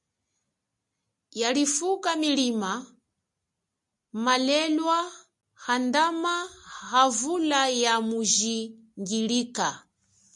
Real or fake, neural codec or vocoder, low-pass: real; none; 10.8 kHz